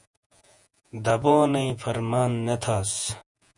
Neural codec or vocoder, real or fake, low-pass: vocoder, 48 kHz, 128 mel bands, Vocos; fake; 10.8 kHz